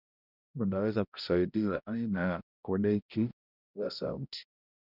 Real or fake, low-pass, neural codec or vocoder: fake; 5.4 kHz; codec, 16 kHz, 0.5 kbps, X-Codec, HuBERT features, trained on balanced general audio